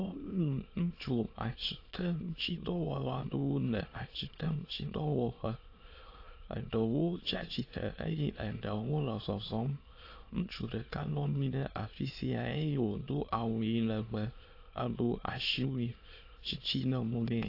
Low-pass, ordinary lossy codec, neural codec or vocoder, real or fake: 5.4 kHz; AAC, 32 kbps; autoencoder, 22.05 kHz, a latent of 192 numbers a frame, VITS, trained on many speakers; fake